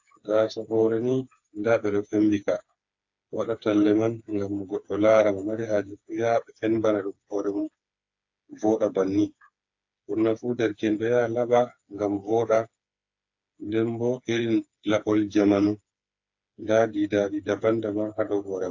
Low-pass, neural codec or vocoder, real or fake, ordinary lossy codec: 7.2 kHz; codec, 16 kHz, 4 kbps, FreqCodec, smaller model; fake; AAC, 48 kbps